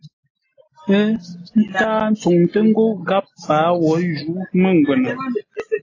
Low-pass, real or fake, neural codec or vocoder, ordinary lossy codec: 7.2 kHz; real; none; AAC, 32 kbps